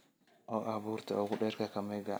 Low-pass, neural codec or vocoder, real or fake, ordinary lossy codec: none; none; real; none